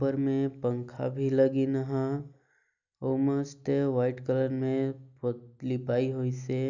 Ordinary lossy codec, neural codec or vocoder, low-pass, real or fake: none; none; 7.2 kHz; real